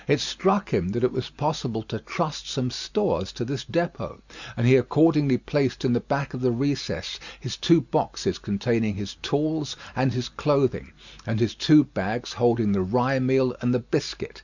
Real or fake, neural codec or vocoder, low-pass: real; none; 7.2 kHz